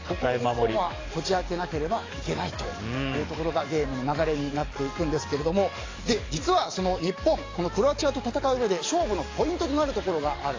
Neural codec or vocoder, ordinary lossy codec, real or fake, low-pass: codec, 44.1 kHz, 7.8 kbps, DAC; AAC, 32 kbps; fake; 7.2 kHz